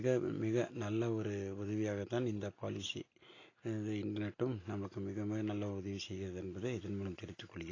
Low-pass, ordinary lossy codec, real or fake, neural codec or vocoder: 7.2 kHz; AAC, 32 kbps; real; none